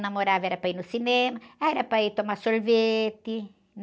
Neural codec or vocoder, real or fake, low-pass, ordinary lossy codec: none; real; none; none